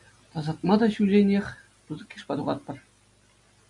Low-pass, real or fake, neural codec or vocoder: 10.8 kHz; real; none